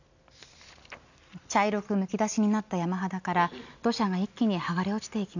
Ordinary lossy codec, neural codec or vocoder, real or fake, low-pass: none; none; real; 7.2 kHz